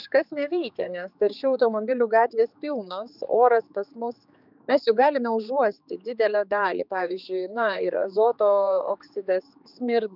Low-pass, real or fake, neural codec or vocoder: 5.4 kHz; fake; codec, 16 kHz, 4 kbps, X-Codec, HuBERT features, trained on general audio